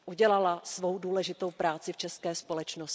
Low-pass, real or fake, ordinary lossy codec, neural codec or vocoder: none; real; none; none